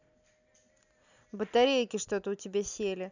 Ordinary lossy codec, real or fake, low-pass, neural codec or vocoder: none; real; 7.2 kHz; none